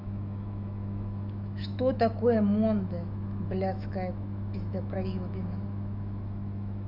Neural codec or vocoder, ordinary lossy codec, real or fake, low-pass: autoencoder, 48 kHz, 128 numbers a frame, DAC-VAE, trained on Japanese speech; none; fake; 5.4 kHz